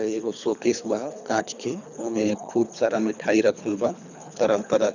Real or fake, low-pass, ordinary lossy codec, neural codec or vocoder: fake; 7.2 kHz; none; codec, 24 kHz, 3 kbps, HILCodec